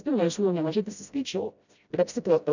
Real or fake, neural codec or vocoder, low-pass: fake; codec, 16 kHz, 0.5 kbps, FreqCodec, smaller model; 7.2 kHz